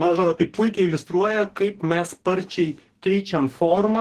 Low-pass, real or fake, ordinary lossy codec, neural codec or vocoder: 14.4 kHz; fake; Opus, 16 kbps; codec, 44.1 kHz, 2.6 kbps, DAC